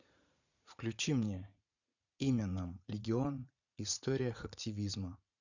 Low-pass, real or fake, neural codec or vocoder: 7.2 kHz; fake; vocoder, 44.1 kHz, 80 mel bands, Vocos